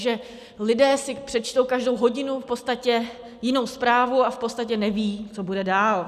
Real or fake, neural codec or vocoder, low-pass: real; none; 14.4 kHz